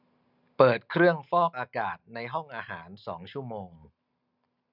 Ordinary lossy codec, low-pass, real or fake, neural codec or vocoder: none; 5.4 kHz; real; none